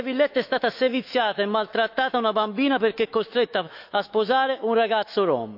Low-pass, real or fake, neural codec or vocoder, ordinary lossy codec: 5.4 kHz; fake; autoencoder, 48 kHz, 128 numbers a frame, DAC-VAE, trained on Japanese speech; none